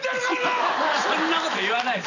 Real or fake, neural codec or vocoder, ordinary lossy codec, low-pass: real; none; none; 7.2 kHz